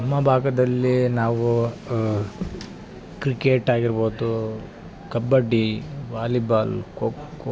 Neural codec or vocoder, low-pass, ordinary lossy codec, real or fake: none; none; none; real